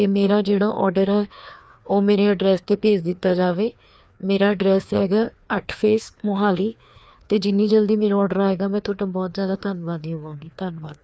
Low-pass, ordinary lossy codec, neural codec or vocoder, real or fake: none; none; codec, 16 kHz, 2 kbps, FreqCodec, larger model; fake